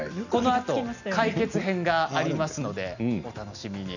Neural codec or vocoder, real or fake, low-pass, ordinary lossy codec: none; real; 7.2 kHz; none